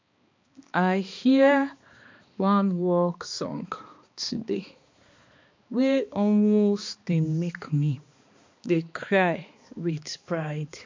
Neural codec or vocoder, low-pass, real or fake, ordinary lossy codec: codec, 16 kHz, 2 kbps, X-Codec, HuBERT features, trained on balanced general audio; 7.2 kHz; fake; MP3, 48 kbps